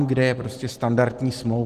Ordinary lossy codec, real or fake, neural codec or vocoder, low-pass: Opus, 16 kbps; real; none; 14.4 kHz